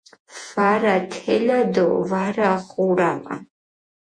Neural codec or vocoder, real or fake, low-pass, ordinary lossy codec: vocoder, 48 kHz, 128 mel bands, Vocos; fake; 9.9 kHz; MP3, 64 kbps